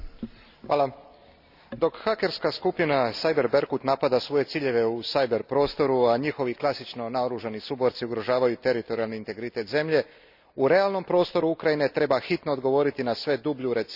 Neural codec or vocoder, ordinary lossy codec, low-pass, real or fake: none; none; 5.4 kHz; real